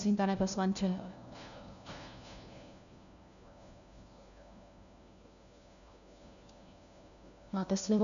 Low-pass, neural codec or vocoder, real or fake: 7.2 kHz; codec, 16 kHz, 1 kbps, FunCodec, trained on LibriTTS, 50 frames a second; fake